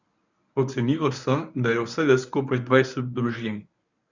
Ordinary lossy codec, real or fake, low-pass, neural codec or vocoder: none; fake; 7.2 kHz; codec, 24 kHz, 0.9 kbps, WavTokenizer, medium speech release version 1